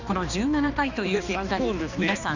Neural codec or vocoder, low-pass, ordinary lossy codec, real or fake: codec, 16 kHz, 2 kbps, X-Codec, HuBERT features, trained on balanced general audio; 7.2 kHz; none; fake